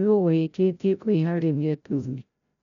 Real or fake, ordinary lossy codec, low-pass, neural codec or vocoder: fake; none; 7.2 kHz; codec, 16 kHz, 0.5 kbps, FreqCodec, larger model